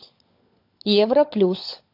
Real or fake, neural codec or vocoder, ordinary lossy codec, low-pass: fake; codec, 16 kHz, 16 kbps, FunCodec, trained on LibriTTS, 50 frames a second; AAC, 32 kbps; 5.4 kHz